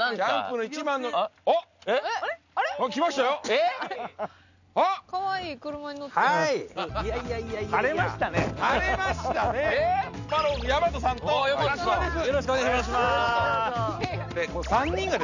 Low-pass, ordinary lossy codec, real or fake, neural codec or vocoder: 7.2 kHz; none; real; none